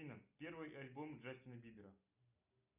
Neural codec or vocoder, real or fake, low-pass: none; real; 3.6 kHz